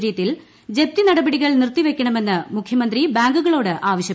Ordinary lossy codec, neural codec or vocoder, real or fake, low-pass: none; none; real; none